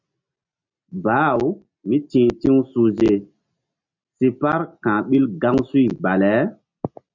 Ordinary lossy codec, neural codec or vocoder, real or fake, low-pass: MP3, 64 kbps; none; real; 7.2 kHz